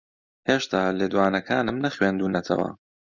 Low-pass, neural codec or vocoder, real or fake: 7.2 kHz; none; real